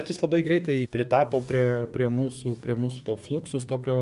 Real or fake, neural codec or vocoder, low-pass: fake; codec, 24 kHz, 1 kbps, SNAC; 10.8 kHz